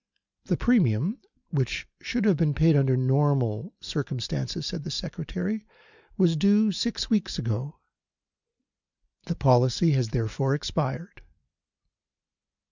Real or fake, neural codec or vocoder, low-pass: real; none; 7.2 kHz